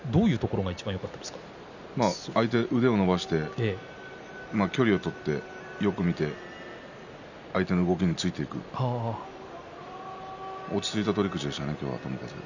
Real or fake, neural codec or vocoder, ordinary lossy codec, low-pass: real; none; none; 7.2 kHz